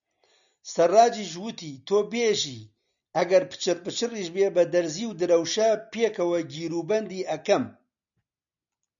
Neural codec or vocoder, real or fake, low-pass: none; real; 7.2 kHz